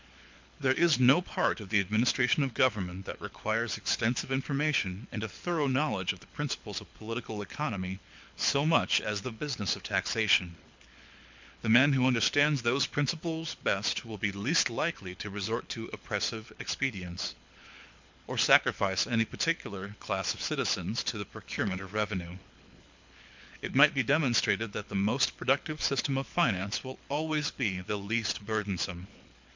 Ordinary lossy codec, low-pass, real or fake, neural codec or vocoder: MP3, 64 kbps; 7.2 kHz; fake; codec, 24 kHz, 6 kbps, HILCodec